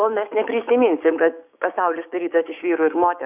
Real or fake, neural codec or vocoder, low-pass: fake; codec, 16 kHz, 6 kbps, DAC; 3.6 kHz